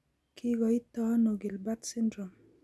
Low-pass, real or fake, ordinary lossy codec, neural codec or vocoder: none; real; none; none